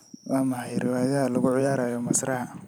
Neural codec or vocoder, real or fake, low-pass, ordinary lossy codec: vocoder, 44.1 kHz, 128 mel bands every 512 samples, BigVGAN v2; fake; none; none